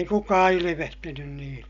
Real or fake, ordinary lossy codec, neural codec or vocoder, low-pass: real; none; none; 7.2 kHz